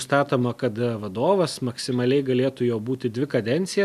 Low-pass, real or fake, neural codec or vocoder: 14.4 kHz; real; none